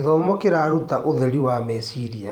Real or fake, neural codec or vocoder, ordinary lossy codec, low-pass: fake; vocoder, 44.1 kHz, 128 mel bands every 512 samples, BigVGAN v2; Opus, 32 kbps; 19.8 kHz